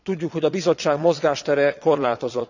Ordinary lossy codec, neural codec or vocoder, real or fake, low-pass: none; vocoder, 22.05 kHz, 80 mel bands, Vocos; fake; 7.2 kHz